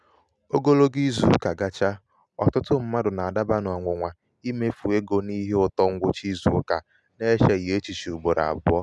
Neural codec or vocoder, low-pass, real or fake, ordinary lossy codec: none; none; real; none